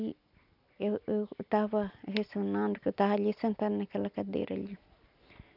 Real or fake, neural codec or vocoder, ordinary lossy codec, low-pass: real; none; none; 5.4 kHz